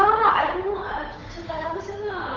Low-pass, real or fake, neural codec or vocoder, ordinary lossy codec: 7.2 kHz; fake; codec, 16 kHz, 8 kbps, FunCodec, trained on Chinese and English, 25 frames a second; Opus, 24 kbps